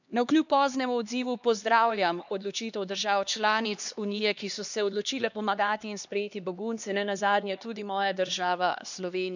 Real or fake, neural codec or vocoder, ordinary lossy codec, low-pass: fake; codec, 16 kHz, 2 kbps, X-Codec, HuBERT features, trained on LibriSpeech; none; 7.2 kHz